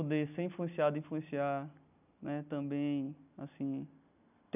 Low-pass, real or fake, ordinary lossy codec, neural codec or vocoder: 3.6 kHz; real; none; none